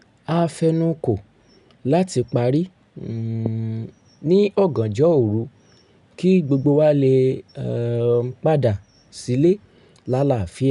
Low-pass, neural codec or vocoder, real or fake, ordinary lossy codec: 10.8 kHz; none; real; none